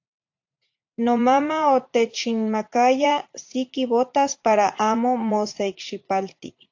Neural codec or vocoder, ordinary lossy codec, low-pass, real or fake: vocoder, 44.1 kHz, 80 mel bands, Vocos; AAC, 48 kbps; 7.2 kHz; fake